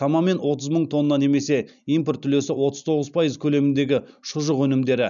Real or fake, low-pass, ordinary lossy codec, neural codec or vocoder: real; 7.2 kHz; none; none